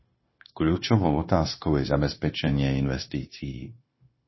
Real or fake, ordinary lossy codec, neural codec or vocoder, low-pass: fake; MP3, 24 kbps; codec, 16 kHz, 0.9 kbps, LongCat-Audio-Codec; 7.2 kHz